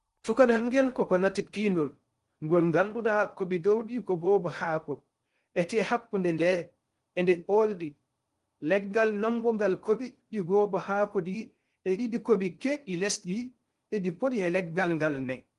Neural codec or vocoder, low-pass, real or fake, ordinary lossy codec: codec, 16 kHz in and 24 kHz out, 0.6 kbps, FocalCodec, streaming, 2048 codes; 10.8 kHz; fake; Opus, 24 kbps